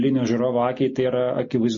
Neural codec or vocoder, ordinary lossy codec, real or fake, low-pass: none; MP3, 32 kbps; real; 7.2 kHz